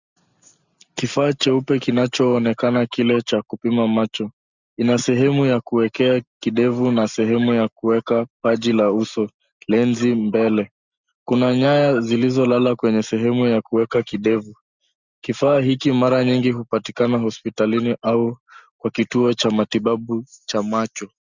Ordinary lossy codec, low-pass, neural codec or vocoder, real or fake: Opus, 64 kbps; 7.2 kHz; none; real